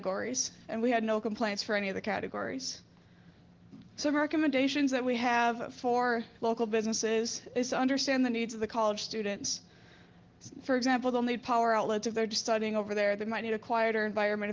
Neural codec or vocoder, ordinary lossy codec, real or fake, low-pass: none; Opus, 16 kbps; real; 7.2 kHz